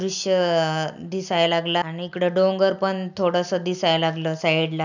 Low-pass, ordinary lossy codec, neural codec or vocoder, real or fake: 7.2 kHz; none; none; real